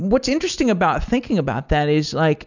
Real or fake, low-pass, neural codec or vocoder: real; 7.2 kHz; none